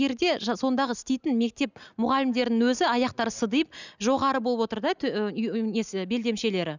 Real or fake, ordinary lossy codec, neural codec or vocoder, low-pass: real; none; none; 7.2 kHz